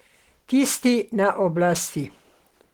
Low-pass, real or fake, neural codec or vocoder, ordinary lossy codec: 19.8 kHz; real; none; Opus, 16 kbps